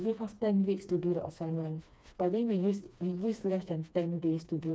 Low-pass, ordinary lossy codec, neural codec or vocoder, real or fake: none; none; codec, 16 kHz, 2 kbps, FreqCodec, smaller model; fake